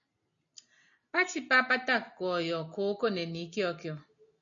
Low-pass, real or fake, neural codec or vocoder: 7.2 kHz; real; none